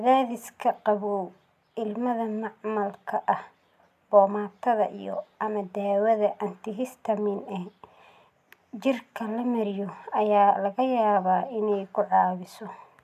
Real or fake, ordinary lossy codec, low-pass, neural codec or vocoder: real; none; 14.4 kHz; none